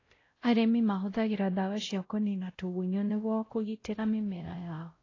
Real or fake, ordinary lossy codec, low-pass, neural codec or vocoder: fake; AAC, 32 kbps; 7.2 kHz; codec, 16 kHz, 0.5 kbps, X-Codec, WavLM features, trained on Multilingual LibriSpeech